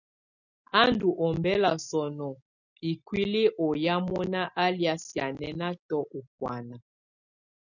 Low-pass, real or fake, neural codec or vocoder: 7.2 kHz; real; none